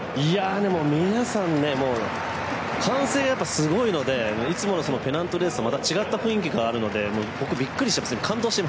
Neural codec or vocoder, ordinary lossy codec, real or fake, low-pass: none; none; real; none